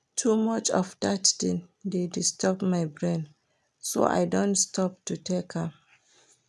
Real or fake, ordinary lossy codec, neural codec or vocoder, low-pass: real; none; none; none